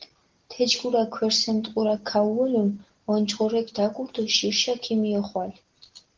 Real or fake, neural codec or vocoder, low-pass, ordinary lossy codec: real; none; 7.2 kHz; Opus, 16 kbps